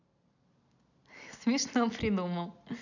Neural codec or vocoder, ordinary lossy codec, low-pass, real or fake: none; none; 7.2 kHz; real